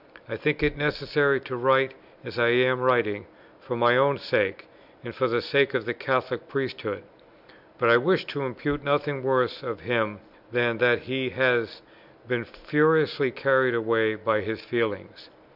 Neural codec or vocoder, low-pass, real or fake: none; 5.4 kHz; real